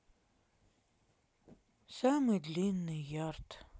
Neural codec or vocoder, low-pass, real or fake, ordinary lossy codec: none; none; real; none